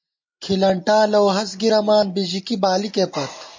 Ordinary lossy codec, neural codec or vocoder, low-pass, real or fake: MP3, 32 kbps; none; 7.2 kHz; real